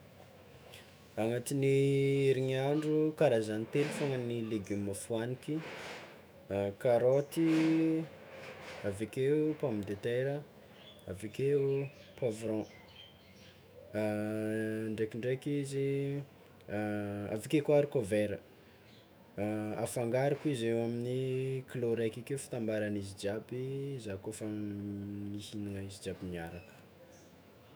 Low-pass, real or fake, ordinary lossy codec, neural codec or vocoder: none; fake; none; autoencoder, 48 kHz, 128 numbers a frame, DAC-VAE, trained on Japanese speech